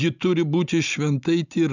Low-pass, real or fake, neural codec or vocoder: 7.2 kHz; real; none